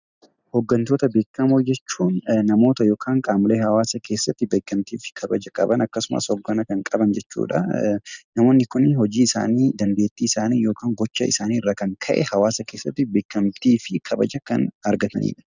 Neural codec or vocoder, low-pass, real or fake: none; 7.2 kHz; real